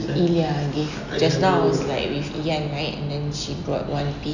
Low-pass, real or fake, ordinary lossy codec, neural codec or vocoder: 7.2 kHz; real; none; none